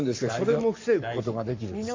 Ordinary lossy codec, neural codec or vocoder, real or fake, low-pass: MP3, 48 kbps; codec, 44.1 kHz, 7.8 kbps, Pupu-Codec; fake; 7.2 kHz